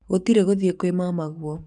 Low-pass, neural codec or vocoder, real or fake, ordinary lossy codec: 10.8 kHz; codec, 44.1 kHz, 7.8 kbps, DAC; fake; none